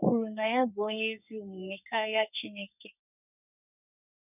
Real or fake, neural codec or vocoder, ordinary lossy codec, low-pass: fake; codec, 32 kHz, 1.9 kbps, SNAC; none; 3.6 kHz